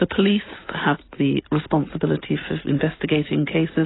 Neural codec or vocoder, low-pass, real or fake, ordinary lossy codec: none; 7.2 kHz; real; AAC, 16 kbps